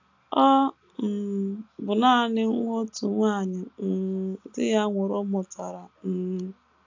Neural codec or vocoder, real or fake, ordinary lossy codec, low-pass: none; real; AAC, 48 kbps; 7.2 kHz